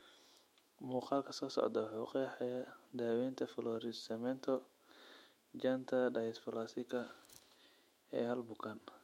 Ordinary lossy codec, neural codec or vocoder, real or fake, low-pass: MP3, 64 kbps; autoencoder, 48 kHz, 128 numbers a frame, DAC-VAE, trained on Japanese speech; fake; 19.8 kHz